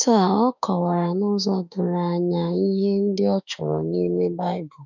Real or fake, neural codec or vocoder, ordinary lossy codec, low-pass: fake; autoencoder, 48 kHz, 32 numbers a frame, DAC-VAE, trained on Japanese speech; none; 7.2 kHz